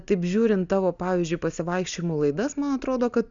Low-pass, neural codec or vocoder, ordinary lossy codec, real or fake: 7.2 kHz; none; Opus, 64 kbps; real